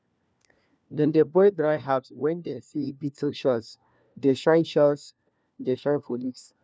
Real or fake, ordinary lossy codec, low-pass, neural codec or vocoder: fake; none; none; codec, 16 kHz, 1 kbps, FunCodec, trained on LibriTTS, 50 frames a second